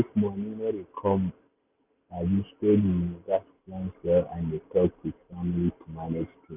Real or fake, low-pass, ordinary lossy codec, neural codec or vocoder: real; 3.6 kHz; none; none